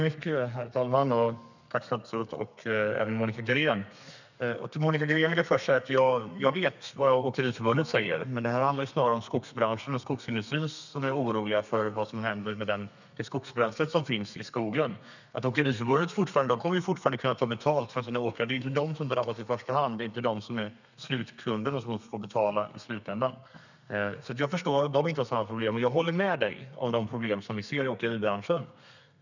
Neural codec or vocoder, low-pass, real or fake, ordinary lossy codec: codec, 32 kHz, 1.9 kbps, SNAC; 7.2 kHz; fake; none